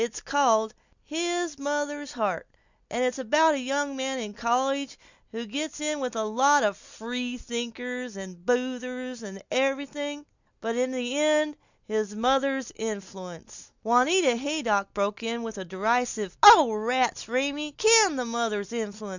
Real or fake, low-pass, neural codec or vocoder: real; 7.2 kHz; none